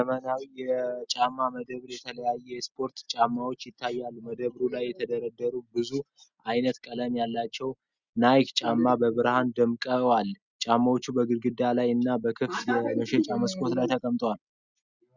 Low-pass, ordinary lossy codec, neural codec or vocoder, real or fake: 7.2 kHz; Opus, 64 kbps; none; real